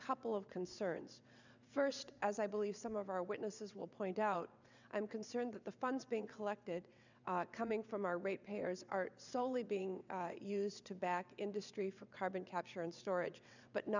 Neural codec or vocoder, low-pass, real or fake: vocoder, 22.05 kHz, 80 mel bands, WaveNeXt; 7.2 kHz; fake